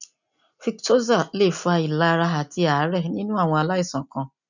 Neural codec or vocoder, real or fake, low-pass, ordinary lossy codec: none; real; 7.2 kHz; none